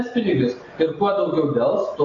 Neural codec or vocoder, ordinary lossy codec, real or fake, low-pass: none; AAC, 32 kbps; real; 7.2 kHz